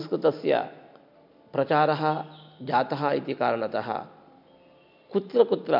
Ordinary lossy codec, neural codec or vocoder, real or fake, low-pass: MP3, 48 kbps; none; real; 5.4 kHz